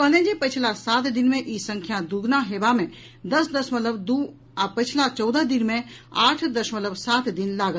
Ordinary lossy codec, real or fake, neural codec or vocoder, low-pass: none; real; none; none